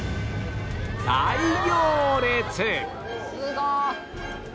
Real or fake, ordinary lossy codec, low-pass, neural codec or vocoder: real; none; none; none